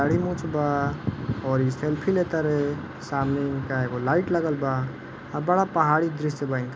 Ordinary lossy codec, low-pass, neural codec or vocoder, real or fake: none; none; none; real